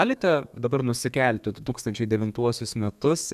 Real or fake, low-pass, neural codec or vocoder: fake; 14.4 kHz; codec, 32 kHz, 1.9 kbps, SNAC